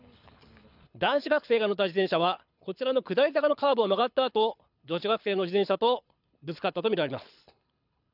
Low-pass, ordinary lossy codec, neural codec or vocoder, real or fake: 5.4 kHz; none; codec, 24 kHz, 6 kbps, HILCodec; fake